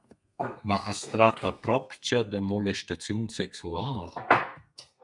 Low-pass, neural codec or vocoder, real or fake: 10.8 kHz; codec, 32 kHz, 1.9 kbps, SNAC; fake